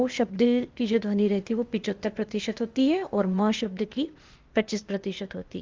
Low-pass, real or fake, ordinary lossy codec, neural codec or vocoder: 7.2 kHz; fake; Opus, 32 kbps; codec, 16 kHz, 0.8 kbps, ZipCodec